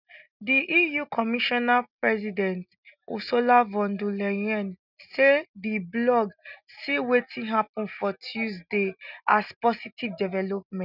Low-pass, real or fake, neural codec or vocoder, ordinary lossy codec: 5.4 kHz; real; none; none